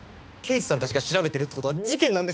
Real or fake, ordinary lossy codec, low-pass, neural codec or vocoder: fake; none; none; codec, 16 kHz, 2 kbps, X-Codec, HuBERT features, trained on balanced general audio